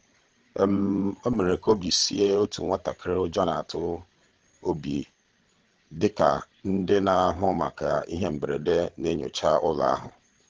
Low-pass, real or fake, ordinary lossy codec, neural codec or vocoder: 7.2 kHz; fake; Opus, 16 kbps; codec, 16 kHz, 8 kbps, FreqCodec, larger model